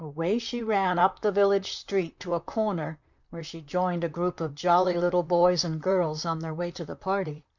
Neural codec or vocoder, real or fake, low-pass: vocoder, 44.1 kHz, 128 mel bands, Pupu-Vocoder; fake; 7.2 kHz